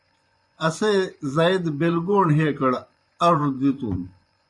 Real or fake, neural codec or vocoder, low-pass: fake; vocoder, 24 kHz, 100 mel bands, Vocos; 10.8 kHz